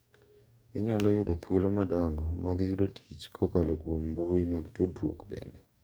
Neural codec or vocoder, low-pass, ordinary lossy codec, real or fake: codec, 44.1 kHz, 2.6 kbps, DAC; none; none; fake